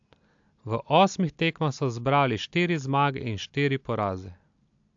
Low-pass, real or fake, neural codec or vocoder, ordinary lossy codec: 7.2 kHz; real; none; AAC, 64 kbps